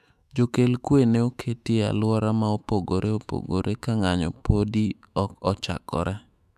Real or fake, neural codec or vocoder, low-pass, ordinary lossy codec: fake; autoencoder, 48 kHz, 128 numbers a frame, DAC-VAE, trained on Japanese speech; 14.4 kHz; none